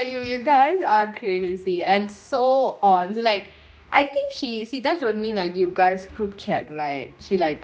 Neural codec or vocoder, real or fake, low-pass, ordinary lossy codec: codec, 16 kHz, 1 kbps, X-Codec, HuBERT features, trained on general audio; fake; none; none